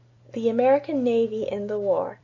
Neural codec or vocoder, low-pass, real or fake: none; 7.2 kHz; real